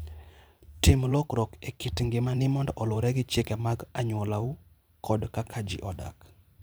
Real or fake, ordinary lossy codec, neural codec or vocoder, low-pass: fake; none; vocoder, 44.1 kHz, 128 mel bands every 512 samples, BigVGAN v2; none